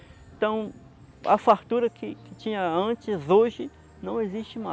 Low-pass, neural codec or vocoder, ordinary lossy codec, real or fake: none; none; none; real